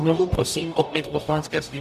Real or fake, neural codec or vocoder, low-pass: fake; codec, 44.1 kHz, 0.9 kbps, DAC; 14.4 kHz